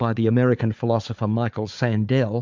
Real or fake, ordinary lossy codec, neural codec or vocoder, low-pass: fake; MP3, 64 kbps; codec, 16 kHz, 4 kbps, FunCodec, trained on Chinese and English, 50 frames a second; 7.2 kHz